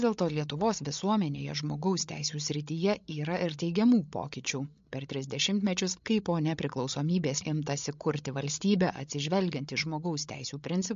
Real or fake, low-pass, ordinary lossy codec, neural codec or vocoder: fake; 7.2 kHz; MP3, 48 kbps; codec, 16 kHz, 16 kbps, FreqCodec, larger model